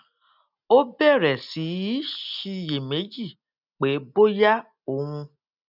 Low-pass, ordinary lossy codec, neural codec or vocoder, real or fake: 5.4 kHz; none; none; real